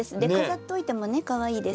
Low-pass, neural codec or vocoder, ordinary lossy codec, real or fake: none; none; none; real